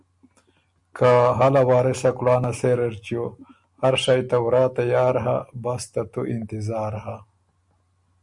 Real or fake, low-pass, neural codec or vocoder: real; 10.8 kHz; none